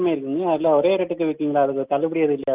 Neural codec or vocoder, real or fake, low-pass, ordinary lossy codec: none; real; 3.6 kHz; Opus, 32 kbps